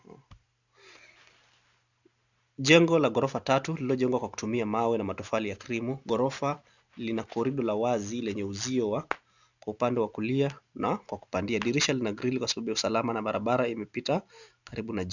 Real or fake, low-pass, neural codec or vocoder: real; 7.2 kHz; none